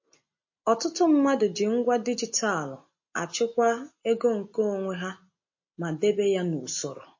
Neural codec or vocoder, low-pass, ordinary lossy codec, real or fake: none; 7.2 kHz; MP3, 32 kbps; real